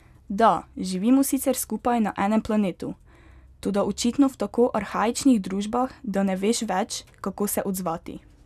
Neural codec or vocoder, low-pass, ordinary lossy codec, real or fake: none; 14.4 kHz; none; real